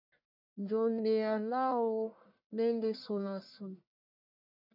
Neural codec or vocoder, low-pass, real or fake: codec, 44.1 kHz, 1.7 kbps, Pupu-Codec; 5.4 kHz; fake